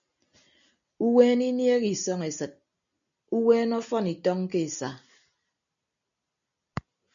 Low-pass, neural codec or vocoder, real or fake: 7.2 kHz; none; real